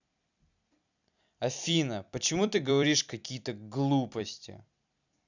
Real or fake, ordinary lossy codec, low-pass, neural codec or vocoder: fake; none; 7.2 kHz; vocoder, 44.1 kHz, 128 mel bands every 256 samples, BigVGAN v2